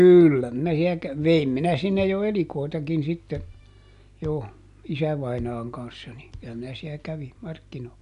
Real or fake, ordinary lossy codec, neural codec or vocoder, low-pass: real; none; none; 10.8 kHz